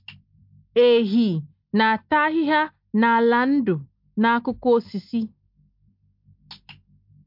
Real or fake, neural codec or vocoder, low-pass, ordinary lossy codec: real; none; 5.4 kHz; MP3, 48 kbps